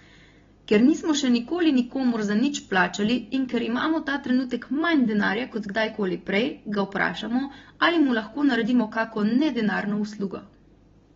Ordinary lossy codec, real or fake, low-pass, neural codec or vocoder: AAC, 24 kbps; real; 7.2 kHz; none